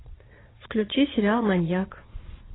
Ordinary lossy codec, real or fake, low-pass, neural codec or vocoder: AAC, 16 kbps; fake; 7.2 kHz; vocoder, 22.05 kHz, 80 mel bands, Vocos